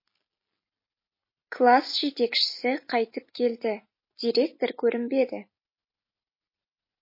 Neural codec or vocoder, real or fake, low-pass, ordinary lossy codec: none; real; 5.4 kHz; MP3, 24 kbps